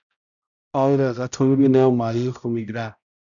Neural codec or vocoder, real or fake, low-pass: codec, 16 kHz, 0.5 kbps, X-Codec, HuBERT features, trained on balanced general audio; fake; 7.2 kHz